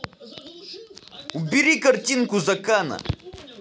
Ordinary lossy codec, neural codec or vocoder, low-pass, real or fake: none; none; none; real